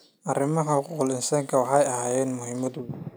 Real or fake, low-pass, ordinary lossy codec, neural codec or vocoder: real; none; none; none